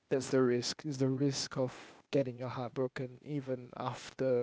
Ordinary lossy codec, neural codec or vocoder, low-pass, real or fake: none; codec, 16 kHz, 0.8 kbps, ZipCodec; none; fake